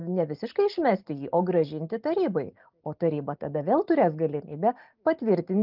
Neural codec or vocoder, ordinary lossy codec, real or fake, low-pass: none; Opus, 24 kbps; real; 5.4 kHz